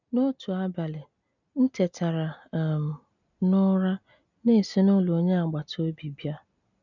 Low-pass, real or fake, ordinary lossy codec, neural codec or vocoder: 7.2 kHz; real; none; none